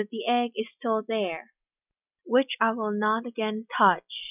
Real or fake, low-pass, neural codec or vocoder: real; 3.6 kHz; none